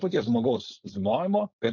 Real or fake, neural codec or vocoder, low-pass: fake; codec, 16 kHz, 4.8 kbps, FACodec; 7.2 kHz